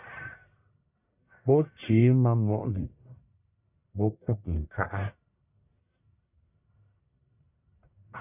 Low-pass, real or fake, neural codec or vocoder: 3.6 kHz; fake; codec, 44.1 kHz, 1.7 kbps, Pupu-Codec